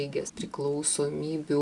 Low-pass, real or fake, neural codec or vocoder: 10.8 kHz; real; none